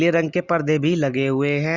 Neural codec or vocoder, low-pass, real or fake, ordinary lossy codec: none; 7.2 kHz; real; Opus, 64 kbps